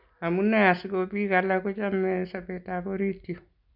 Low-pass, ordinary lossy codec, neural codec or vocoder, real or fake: 5.4 kHz; none; none; real